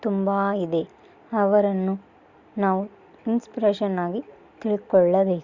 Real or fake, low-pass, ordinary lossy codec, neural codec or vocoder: real; 7.2 kHz; Opus, 64 kbps; none